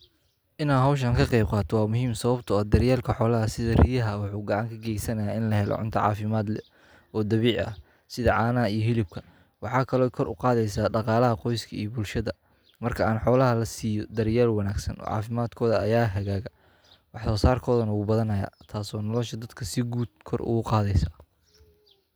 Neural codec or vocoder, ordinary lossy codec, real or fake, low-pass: none; none; real; none